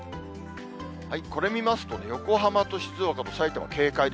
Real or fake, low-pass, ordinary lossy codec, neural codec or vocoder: real; none; none; none